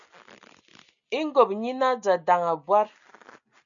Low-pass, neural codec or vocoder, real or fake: 7.2 kHz; none; real